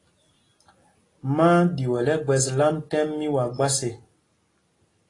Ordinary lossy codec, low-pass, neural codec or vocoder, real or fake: AAC, 32 kbps; 10.8 kHz; none; real